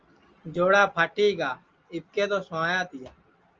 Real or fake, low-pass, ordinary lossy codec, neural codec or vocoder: real; 7.2 kHz; Opus, 24 kbps; none